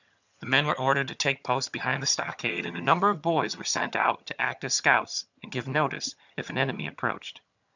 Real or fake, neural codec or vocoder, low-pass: fake; vocoder, 22.05 kHz, 80 mel bands, HiFi-GAN; 7.2 kHz